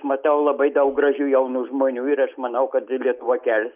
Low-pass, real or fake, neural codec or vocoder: 3.6 kHz; real; none